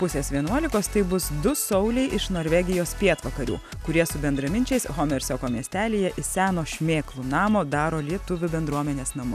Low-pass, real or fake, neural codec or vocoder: 14.4 kHz; real; none